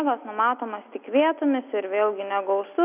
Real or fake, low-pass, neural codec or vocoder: real; 3.6 kHz; none